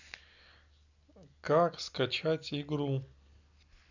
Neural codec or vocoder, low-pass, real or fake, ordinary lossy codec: none; 7.2 kHz; real; none